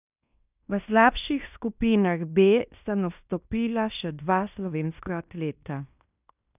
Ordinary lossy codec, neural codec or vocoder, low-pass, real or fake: none; codec, 16 kHz in and 24 kHz out, 0.9 kbps, LongCat-Audio-Codec, fine tuned four codebook decoder; 3.6 kHz; fake